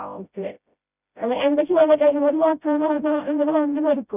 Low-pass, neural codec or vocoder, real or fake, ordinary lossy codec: 3.6 kHz; codec, 16 kHz, 0.5 kbps, FreqCodec, smaller model; fake; none